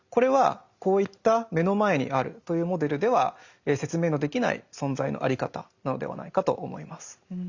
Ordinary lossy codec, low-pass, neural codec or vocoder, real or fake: Opus, 64 kbps; 7.2 kHz; none; real